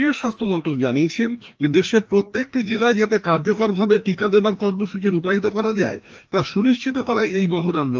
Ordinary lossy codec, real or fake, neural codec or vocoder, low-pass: Opus, 24 kbps; fake; codec, 16 kHz, 1 kbps, FreqCodec, larger model; 7.2 kHz